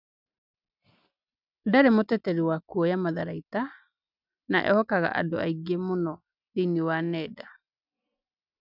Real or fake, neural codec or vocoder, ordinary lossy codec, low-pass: real; none; none; 5.4 kHz